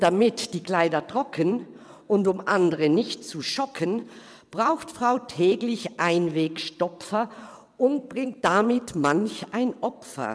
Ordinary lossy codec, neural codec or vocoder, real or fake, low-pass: none; vocoder, 22.05 kHz, 80 mel bands, WaveNeXt; fake; none